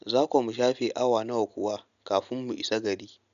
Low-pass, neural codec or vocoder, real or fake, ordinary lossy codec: 7.2 kHz; none; real; none